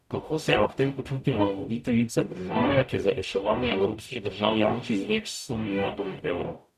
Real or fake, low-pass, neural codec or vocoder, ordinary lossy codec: fake; 14.4 kHz; codec, 44.1 kHz, 0.9 kbps, DAC; none